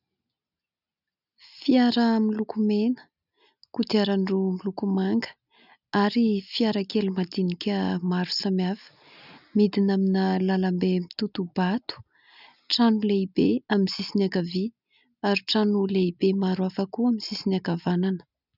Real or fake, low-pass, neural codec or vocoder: real; 5.4 kHz; none